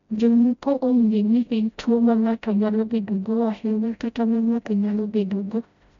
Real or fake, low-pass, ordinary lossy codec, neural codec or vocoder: fake; 7.2 kHz; MP3, 64 kbps; codec, 16 kHz, 0.5 kbps, FreqCodec, smaller model